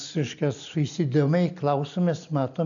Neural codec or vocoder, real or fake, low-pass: none; real; 7.2 kHz